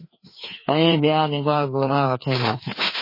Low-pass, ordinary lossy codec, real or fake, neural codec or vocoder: 5.4 kHz; MP3, 24 kbps; fake; codec, 16 kHz, 2 kbps, FreqCodec, larger model